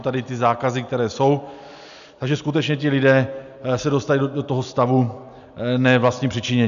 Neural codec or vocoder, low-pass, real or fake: none; 7.2 kHz; real